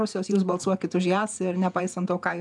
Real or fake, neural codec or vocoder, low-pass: fake; vocoder, 48 kHz, 128 mel bands, Vocos; 10.8 kHz